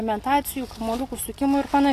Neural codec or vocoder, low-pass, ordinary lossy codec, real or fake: none; 14.4 kHz; AAC, 48 kbps; real